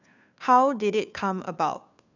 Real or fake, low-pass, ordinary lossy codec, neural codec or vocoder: fake; 7.2 kHz; none; codec, 16 kHz, 2 kbps, FunCodec, trained on Chinese and English, 25 frames a second